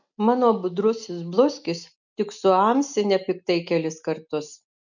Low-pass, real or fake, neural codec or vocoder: 7.2 kHz; real; none